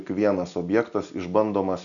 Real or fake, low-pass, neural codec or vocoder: real; 7.2 kHz; none